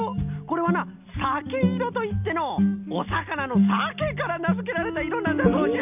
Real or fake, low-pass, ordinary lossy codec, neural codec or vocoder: real; 3.6 kHz; none; none